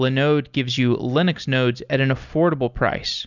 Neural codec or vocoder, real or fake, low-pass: none; real; 7.2 kHz